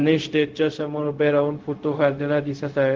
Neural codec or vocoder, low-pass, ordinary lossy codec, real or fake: codec, 16 kHz, 0.4 kbps, LongCat-Audio-Codec; 7.2 kHz; Opus, 16 kbps; fake